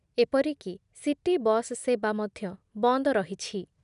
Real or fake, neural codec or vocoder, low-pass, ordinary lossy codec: real; none; 10.8 kHz; none